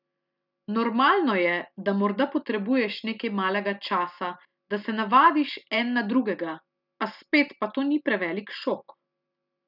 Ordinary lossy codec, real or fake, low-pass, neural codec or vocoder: none; real; 5.4 kHz; none